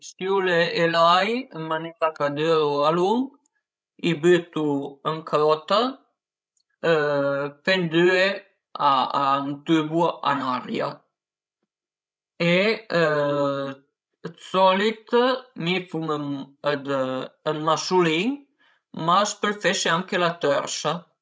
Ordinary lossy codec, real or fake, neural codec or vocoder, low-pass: none; fake; codec, 16 kHz, 16 kbps, FreqCodec, larger model; none